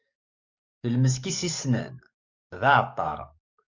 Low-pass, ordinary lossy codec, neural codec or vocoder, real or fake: 7.2 kHz; MP3, 48 kbps; codec, 44.1 kHz, 7.8 kbps, DAC; fake